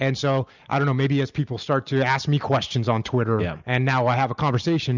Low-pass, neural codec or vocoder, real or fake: 7.2 kHz; none; real